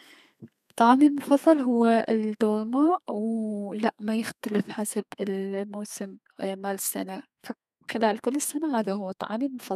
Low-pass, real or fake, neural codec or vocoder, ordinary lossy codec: 14.4 kHz; fake; codec, 32 kHz, 1.9 kbps, SNAC; none